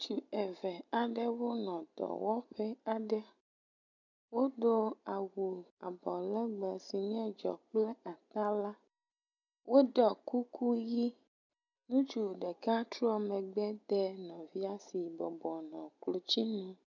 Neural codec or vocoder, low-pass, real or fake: none; 7.2 kHz; real